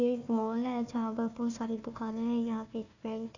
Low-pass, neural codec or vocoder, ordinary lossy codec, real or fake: 7.2 kHz; codec, 16 kHz, 1 kbps, FunCodec, trained on Chinese and English, 50 frames a second; none; fake